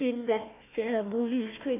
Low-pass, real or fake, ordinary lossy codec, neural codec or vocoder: 3.6 kHz; fake; MP3, 32 kbps; codec, 16 kHz, 1 kbps, FunCodec, trained on Chinese and English, 50 frames a second